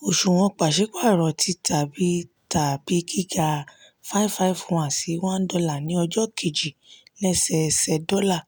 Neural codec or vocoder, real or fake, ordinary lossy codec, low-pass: none; real; none; none